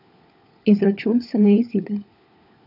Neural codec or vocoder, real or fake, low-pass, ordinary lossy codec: codec, 16 kHz, 4 kbps, FunCodec, trained on LibriTTS, 50 frames a second; fake; 5.4 kHz; none